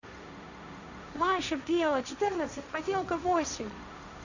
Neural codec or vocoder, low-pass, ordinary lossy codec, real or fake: codec, 16 kHz, 1.1 kbps, Voila-Tokenizer; 7.2 kHz; none; fake